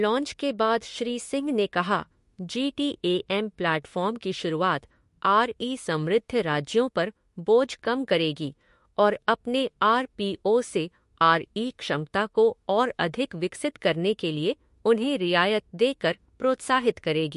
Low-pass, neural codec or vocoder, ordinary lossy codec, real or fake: 14.4 kHz; autoencoder, 48 kHz, 32 numbers a frame, DAC-VAE, trained on Japanese speech; MP3, 48 kbps; fake